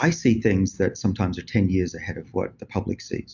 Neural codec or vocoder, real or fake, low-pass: none; real; 7.2 kHz